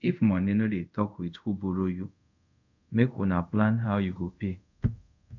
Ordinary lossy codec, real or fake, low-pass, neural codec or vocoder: none; fake; 7.2 kHz; codec, 24 kHz, 0.5 kbps, DualCodec